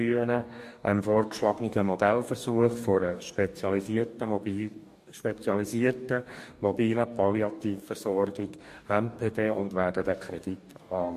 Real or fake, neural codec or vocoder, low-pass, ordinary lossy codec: fake; codec, 44.1 kHz, 2.6 kbps, DAC; 14.4 kHz; MP3, 64 kbps